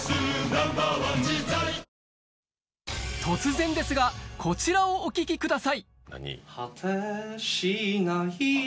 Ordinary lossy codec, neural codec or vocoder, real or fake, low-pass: none; none; real; none